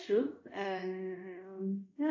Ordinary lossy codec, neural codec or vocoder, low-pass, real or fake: AAC, 48 kbps; codec, 24 kHz, 0.5 kbps, DualCodec; 7.2 kHz; fake